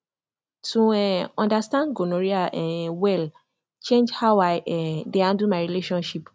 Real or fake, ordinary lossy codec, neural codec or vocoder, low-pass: real; none; none; none